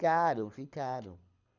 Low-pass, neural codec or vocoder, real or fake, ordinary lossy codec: 7.2 kHz; codec, 24 kHz, 6 kbps, HILCodec; fake; none